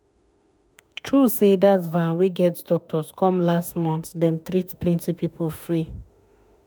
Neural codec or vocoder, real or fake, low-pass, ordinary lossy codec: autoencoder, 48 kHz, 32 numbers a frame, DAC-VAE, trained on Japanese speech; fake; none; none